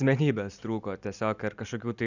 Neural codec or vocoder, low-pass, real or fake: none; 7.2 kHz; real